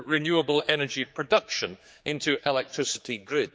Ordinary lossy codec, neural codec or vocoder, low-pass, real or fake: none; codec, 16 kHz, 4 kbps, X-Codec, HuBERT features, trained on general audio; none; fake